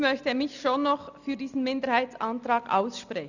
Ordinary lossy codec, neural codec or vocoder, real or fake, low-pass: none; none; real; 7.2 kHz